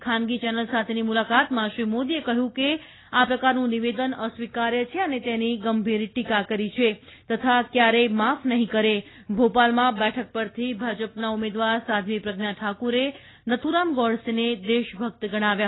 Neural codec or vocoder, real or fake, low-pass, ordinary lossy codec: none; real; 7.2 kHz; AAC, 16 kbps